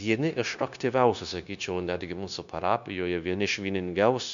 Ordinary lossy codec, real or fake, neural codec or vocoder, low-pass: MP3, 96 kbps; fake; codec, 16 kHz, 0.9 kbps, LongCat-Audio-Codec; 7.2 kHz